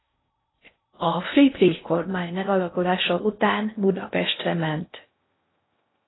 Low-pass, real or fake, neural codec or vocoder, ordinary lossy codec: 7.2 kHz; fake; codec, 16 kHz in and 24 kHz out, 0.6 kbps, FocalCodec, streaming, 4096 codes; AAC, 16 kbps